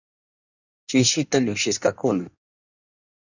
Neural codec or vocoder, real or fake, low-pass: codec, 16 kHz in and 24 kHz out, 1.1 kbps, FireRedTTS-2 codec; fake; 7.2 kHz